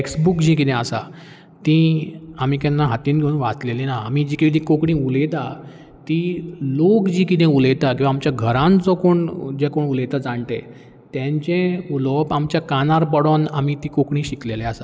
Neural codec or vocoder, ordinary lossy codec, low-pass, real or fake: none; none; none; real